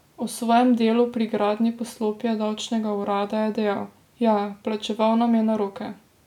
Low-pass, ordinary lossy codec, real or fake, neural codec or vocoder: 19.8 kHz; none; real; none